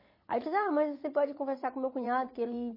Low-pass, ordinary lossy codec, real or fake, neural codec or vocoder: 5.4 kHz; MP3, 24 kbps; fake; vocoder, 44.1 kHz, 80 mel bands, Vocos